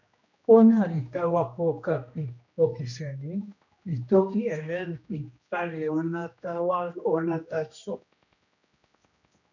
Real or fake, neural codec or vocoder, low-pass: fake; codec, 16 kHz, 1 kbps, X-Codec, HuBERT features, trained on general audio; 7.2 kHz